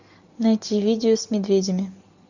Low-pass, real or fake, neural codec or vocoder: 7.2 kHz; real; none